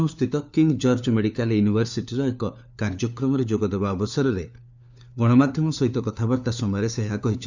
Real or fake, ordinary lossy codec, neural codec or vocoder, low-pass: fake; none; codec, 16 kHz, 4 kbps, FunCodec, trained on LibriTTS, 50 frames a second; 7.2 kHz